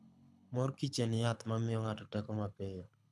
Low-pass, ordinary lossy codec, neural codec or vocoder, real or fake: none; none; codec, 24 kHz, 6 kbps, HILCodec; fake